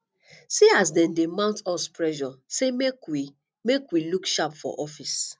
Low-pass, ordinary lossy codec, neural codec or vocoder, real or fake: none; none; none; real